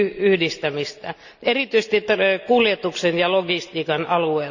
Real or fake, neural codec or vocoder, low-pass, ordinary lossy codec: real; none; 7.2 kHz; none